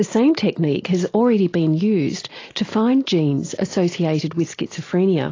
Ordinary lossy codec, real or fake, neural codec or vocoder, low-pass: AAC, 32 kbps; real; none; 7.2 kHz